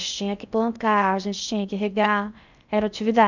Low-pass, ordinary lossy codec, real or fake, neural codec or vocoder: 7.2 kHz; none; fake; codec, 16 kHz in and 24 kHz out, 0.6 kbps, FocalCodec, streaming, 2048 codes